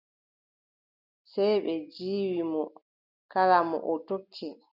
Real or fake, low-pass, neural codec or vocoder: real; 5.4 kHz; none